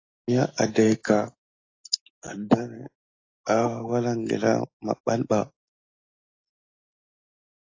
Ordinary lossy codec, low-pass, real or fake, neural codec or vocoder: AAC, 32 kbps; 7.2 kHz; real; none